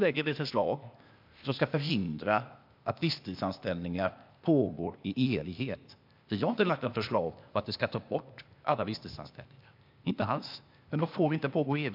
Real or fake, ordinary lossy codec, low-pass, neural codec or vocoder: fake; MP3, 48 kbps; 5.4 kHz; codec, 16 kHz, 0.8 kbps, ZipCodec